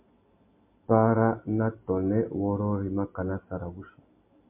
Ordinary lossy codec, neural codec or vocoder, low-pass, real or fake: MP3, 32 kbps; none; 3.6 kHz; real